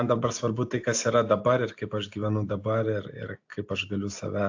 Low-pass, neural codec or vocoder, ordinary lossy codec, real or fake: 7.2 kHz; none; AAC, 48 kbps; real